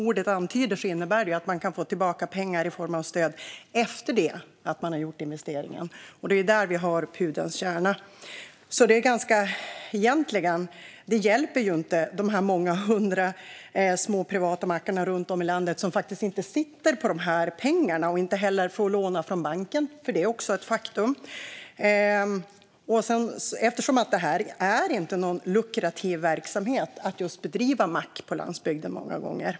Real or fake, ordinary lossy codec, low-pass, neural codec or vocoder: real; none; none; none